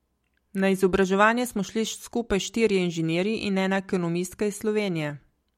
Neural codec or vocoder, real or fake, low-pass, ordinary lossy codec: none; real; 19.8 kHz; MP3, 64 kbps